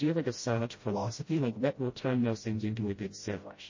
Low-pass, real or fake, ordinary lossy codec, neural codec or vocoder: 7.2 kHz; fake; MP3, 32 kbps; codec, 16 kHz, 0.5 kbps, FreqCodec, smaller model